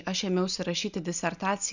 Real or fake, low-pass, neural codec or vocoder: real; 7.2 kHz; none